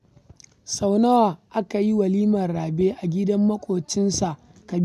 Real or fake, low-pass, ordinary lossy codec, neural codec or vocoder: real; 14.4 kHz; none; none